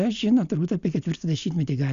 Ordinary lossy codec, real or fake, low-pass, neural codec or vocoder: Opus, 64 kbps; real; 7.2 kHz; none